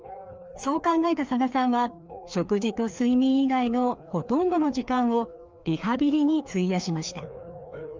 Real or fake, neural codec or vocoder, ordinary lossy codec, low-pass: fake; codec, 16 kHz, 2 kbps, FreqCodec, larger model; Opus, 24 kbps; 7.2 kHz